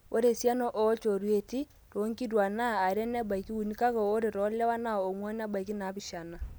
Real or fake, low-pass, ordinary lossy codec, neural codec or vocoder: real; none; none; none